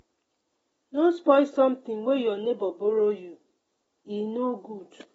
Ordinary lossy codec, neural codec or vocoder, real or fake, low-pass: AAC, 24 kbps; none; real; 19.8 kHz